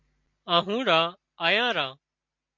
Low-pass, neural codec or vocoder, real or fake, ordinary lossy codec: 7.2 kHz; none; real; MP3, 48 kbps